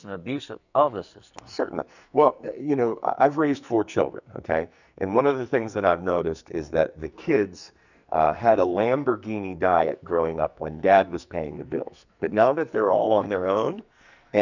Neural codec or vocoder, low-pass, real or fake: codec, 44.1 kHz, 2.6 kbps, SNAC; 7.2 kHz; fake